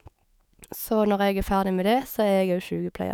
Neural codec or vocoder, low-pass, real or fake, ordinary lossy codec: autoencoder, 48 kHz, 128 numbers a frame, DAC-VAE, trained on Japanese speech; none; fake; none